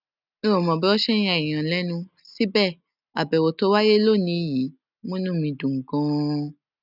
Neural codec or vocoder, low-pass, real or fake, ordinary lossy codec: none; 5.4 kHz; real; none